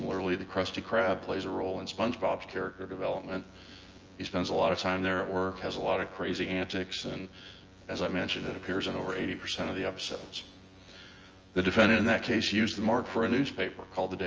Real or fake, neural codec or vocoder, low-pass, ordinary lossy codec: fake; vocoder, 24 kHz, 100 mel bands, Vocos; 7.2 kHz; Opus, 24 kbps